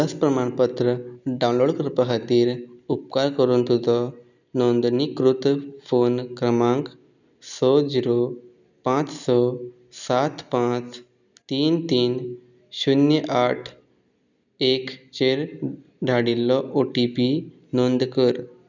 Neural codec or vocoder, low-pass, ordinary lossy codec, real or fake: none; 7.2 kHz; none; real